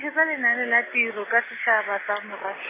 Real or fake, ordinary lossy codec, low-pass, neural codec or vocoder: real; MP3, 16 kbps; 3.6 kHz; none